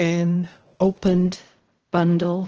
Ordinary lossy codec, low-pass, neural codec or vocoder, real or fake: Opus, 16 kbps; 7.2 kHz; codec, 16 kHz in and 24 kHz out, 0.4 kbps, LongCat-Audio-Codec, fine tuned four codebook decoder; fake